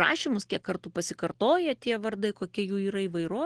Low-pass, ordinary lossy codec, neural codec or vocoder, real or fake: 9.9 kHz; Opus, 16 kbps; none; real